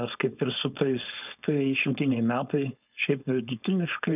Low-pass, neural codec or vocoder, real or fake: 3.6 kHz; codec, 16 kHz, 4.8 kbps, FACodec; fake